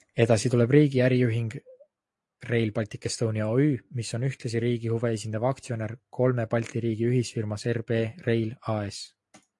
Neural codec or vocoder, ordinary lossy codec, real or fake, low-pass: none; AAC, 64 kbps; real; 10.8 kHz